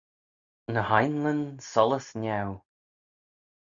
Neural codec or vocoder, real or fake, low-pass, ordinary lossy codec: none; real; 7.2 kHz; Opus, 64 kbps